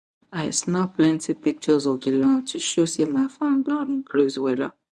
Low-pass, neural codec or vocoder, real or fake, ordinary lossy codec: none; codec, 24 kHz, 0.9 kbps, WavTokenizer, medium speech release version 1; fake; none